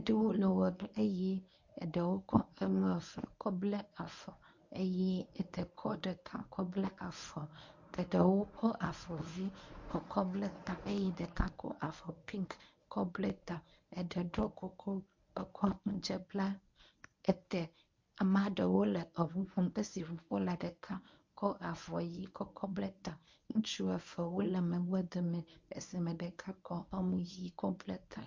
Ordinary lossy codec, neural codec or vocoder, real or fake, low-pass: MP3, 48 kbps; codec, 24 kHz, 0.9 kbps, WavTokenizer, medium speech release version 1; fake; 7.2 kHz